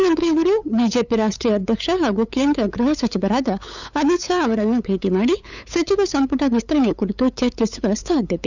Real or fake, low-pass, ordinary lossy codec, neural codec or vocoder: fake; 7.2 kHz; none; codec, 16 kHz, 8 kbps, FunCodec, trained on LibriTTS, 25 frames a second